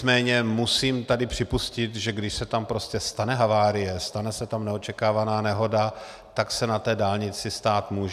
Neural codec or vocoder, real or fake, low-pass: none; real; 14.4 kHz